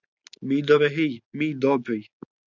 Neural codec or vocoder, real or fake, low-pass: none; real; 7.2 kHz